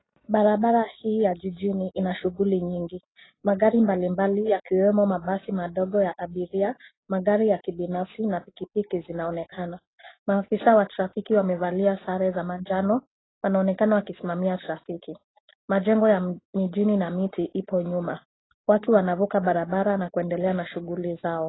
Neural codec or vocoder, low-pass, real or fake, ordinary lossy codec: none; 7.2 kHz; real; AAC, 16 kbps